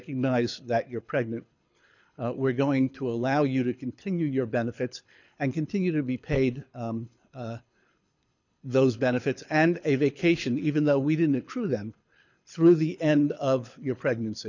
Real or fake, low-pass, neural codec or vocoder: fake; 7.2 kHz; codec, 24 kHz, 6 kbps, HILCodec